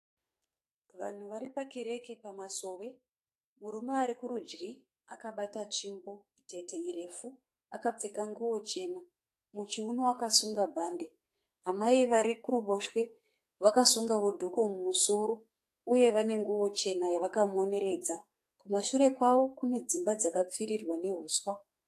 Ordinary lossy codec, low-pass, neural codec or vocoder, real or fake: AAC, 64 kbps; 14.4 kHz; codec, 44.1 kHz, 2.6 kbps, SNAC; fake